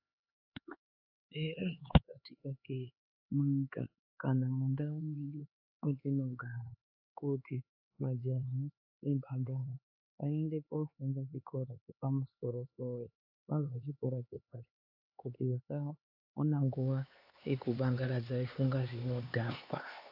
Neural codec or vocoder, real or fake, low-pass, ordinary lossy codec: codec, 16 kHz, 4 kbps, X-Codec, HuBERT features, trained on LibriSpeech; fake; 5.4 kHz; AAC, 48 kbps